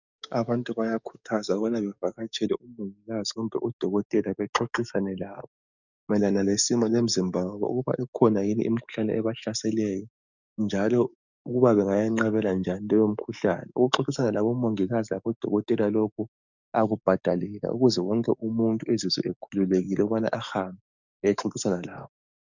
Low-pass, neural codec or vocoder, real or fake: 7.2 kHz; codec, 44.1 kHz, 7.8 kbps, DAC; fake